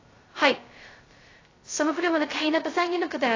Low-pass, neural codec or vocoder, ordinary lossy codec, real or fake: 7.2 kHz; codec, 16 kHz, 0.2 kbps, FocalCodec; AAC, 32 kbps; fake